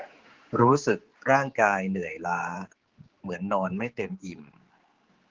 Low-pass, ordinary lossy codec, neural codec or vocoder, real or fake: 7.2 kHz; Opus, 16 kbps; codec, 16 kHz, 4 kbps, X-Codec, HuBERT features, trained on general audio; fake